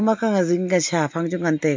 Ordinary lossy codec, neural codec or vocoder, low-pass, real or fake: MP3, 48 kbps; none; 7.2 kHz; real